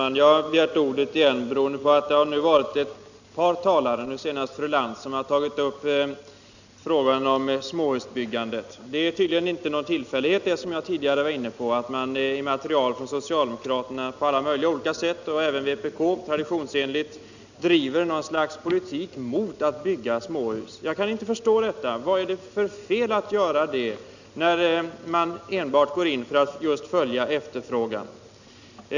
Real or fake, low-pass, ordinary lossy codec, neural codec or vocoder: real; 7.2 kHz; none; none